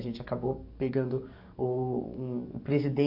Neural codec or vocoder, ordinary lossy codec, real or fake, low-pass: codec, 44.1 kHz, 7.8 kbps, DAC; AAC, 48 kbps; fake; 5.4 kHz